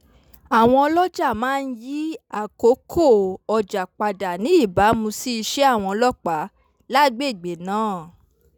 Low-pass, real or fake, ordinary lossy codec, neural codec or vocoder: none; real; none; none